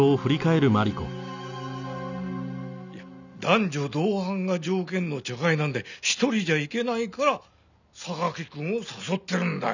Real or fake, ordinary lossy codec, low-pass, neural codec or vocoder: real; none; 7.2 kHz; none